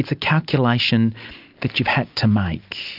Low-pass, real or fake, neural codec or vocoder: 5.4 kHz; real; none